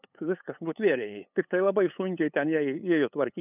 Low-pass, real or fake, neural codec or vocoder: 3.6 kHz; fake; codec, 16 kHz, 8 kbps, FunCodec, trained on LibriTTS, 25 frames a second